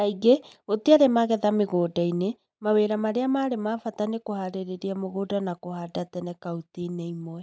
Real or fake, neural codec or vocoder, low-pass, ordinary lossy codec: real; none; none; none